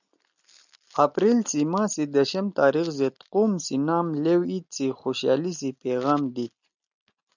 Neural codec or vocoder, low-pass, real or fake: none; 7.2 kHz; real